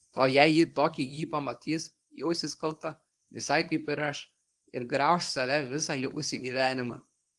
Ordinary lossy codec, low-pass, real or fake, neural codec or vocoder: Opus, 24 kbps; 10.8 kHz; fake; codec, 24 kHz, 0.9 kbps, WavTokenizer, small release